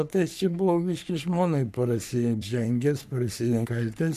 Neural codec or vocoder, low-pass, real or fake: codec, 44.1 kHz, 3.4 kbps, Pupu-Codec; 14.4 kHz; fake